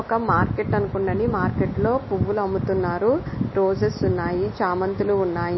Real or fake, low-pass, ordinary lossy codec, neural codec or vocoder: real; 7.2 kHz; MP3, 24 kbps; none